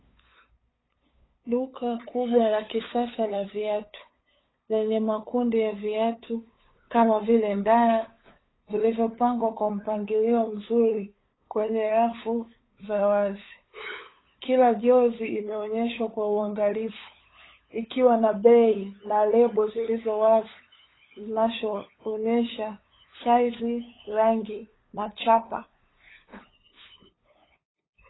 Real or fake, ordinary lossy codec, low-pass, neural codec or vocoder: fake; AAC, 16 kbps; 7.2 kHz; codec, 16 kHz, 8 kbps, FunCodec, trained on LibriTTS, 25 frames a second